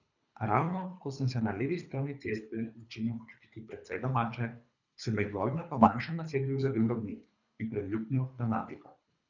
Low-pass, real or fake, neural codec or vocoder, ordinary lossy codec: 7.2 kHz; fake; codec, 24 kHz, 3 kbps, HILCodec; none